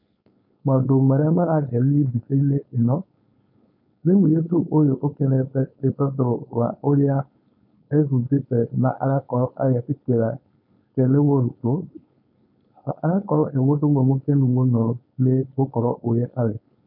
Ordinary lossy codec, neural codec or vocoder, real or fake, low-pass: none; codec, 16 kHz, 4.8 kbps, FACodec; fake; 5.4 kHz